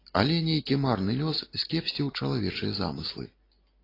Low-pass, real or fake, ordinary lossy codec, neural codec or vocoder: 5.4 kHz; real; AAC, 24 kbps; none